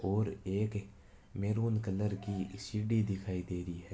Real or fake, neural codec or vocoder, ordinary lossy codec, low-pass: real; none; none; none